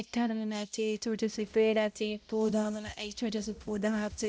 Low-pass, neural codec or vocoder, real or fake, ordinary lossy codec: none; codec, 16 kHz, 0.5 kbps, X-Codec, HuBERT features, trained on balanced general audio; fake; none